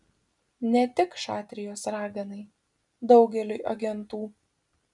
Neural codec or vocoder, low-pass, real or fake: none; 10.8 kHz; real